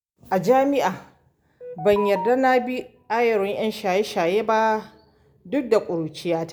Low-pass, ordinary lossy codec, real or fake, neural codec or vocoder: none; none; real; none